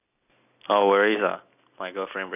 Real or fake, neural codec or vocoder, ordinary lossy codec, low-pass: real; none; none; 3.6 kHz